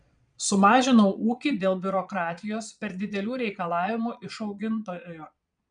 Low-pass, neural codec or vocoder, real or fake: 9.9 kHz; vocoder, 22.05 kHz, 80 mel bands, Vocos; fake